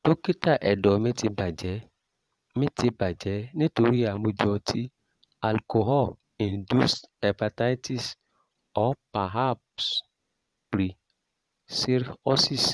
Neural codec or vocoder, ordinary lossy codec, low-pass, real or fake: vocoder, 22.05 kHz, 80 mel bands, Vocos; none; none; fake